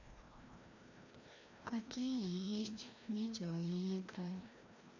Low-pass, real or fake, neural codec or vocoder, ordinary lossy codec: 7.2 kHz; fake; codec, 16 kHz, 1 kbps, FreqCodec, larger model; none